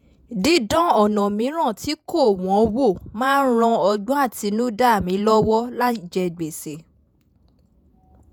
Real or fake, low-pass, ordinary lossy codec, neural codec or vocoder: fake; none; none; vocoder, 48 kHz, 128 mel bands, Vocos